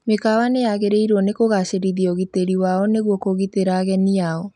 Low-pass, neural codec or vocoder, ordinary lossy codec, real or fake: 10.8 kHz; none; none; real